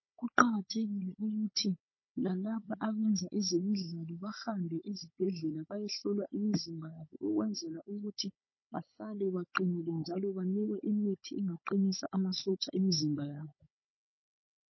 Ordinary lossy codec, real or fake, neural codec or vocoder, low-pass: MP3, 24 kbps; fake; codec, 16 kHz, 16 kbps, FunCodec, trained on Chinese and English, 50 frames a second; 7.2 kHz